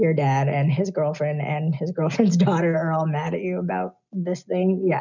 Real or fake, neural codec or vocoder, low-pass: real; none; 7.2 kHz